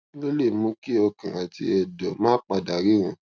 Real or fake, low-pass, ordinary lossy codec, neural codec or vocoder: real; none; none; none